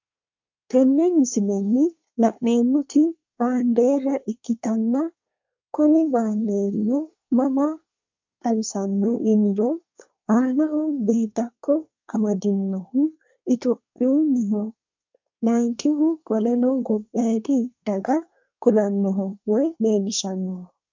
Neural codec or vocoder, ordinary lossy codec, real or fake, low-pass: codec, 24 kHz, 1 kbps, SNAC; MP3, 64 kbps; fake; 7.2 kHz